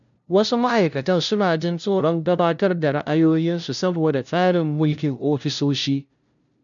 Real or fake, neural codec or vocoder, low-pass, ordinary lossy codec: fake; codec, 16 kHz, 0.5 kbps, FunCodec, trained on LibriTTS, 25 frames a second; 7.2 kHz; none